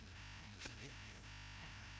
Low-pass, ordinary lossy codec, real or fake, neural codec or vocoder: none; none; fake; codec, 16 kHz, 0.5 kbps, FreqCodec, larger model